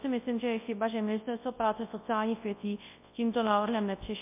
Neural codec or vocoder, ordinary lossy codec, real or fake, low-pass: codec, 24 kHz, 0.9 kbps, WavTokenizer, large speech release; MP3, 24 kbps; fake; 3.6 kHz